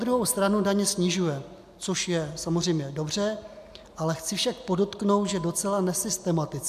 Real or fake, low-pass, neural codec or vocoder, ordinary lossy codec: real; 14.4 kHz; none; AAC, 96 kbps